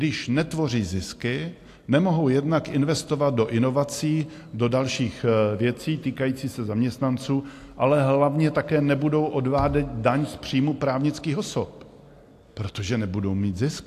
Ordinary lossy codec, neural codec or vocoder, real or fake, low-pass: AAC, 64 kbps; none; real; 14.4 kHz